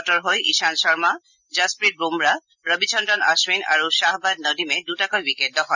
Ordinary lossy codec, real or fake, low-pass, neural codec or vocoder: none; real; 7.2 kHz; none